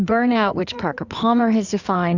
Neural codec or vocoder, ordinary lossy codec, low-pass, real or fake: codec, 16 kHz in and 24 kHz out, 2.2 kbps, FireRedTTS-2 codec; Opus, 64 kbps; 7.2 kHz; fake